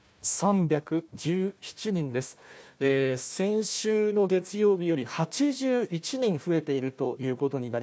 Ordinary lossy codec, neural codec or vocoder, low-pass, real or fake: none; codec, 16 kHz, 1 kbps, FunCodec, trained on Chinese and English, 50 frames a second; none; fake